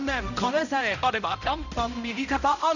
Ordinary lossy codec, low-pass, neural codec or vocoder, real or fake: none; 7.2 kHz; codec, 16 kHz, 0.5 kbps, X-Codec, HuBERT features, trained on balanced general audio; fake